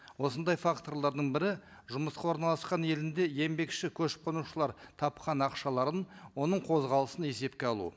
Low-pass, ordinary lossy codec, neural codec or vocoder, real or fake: none; none; none; real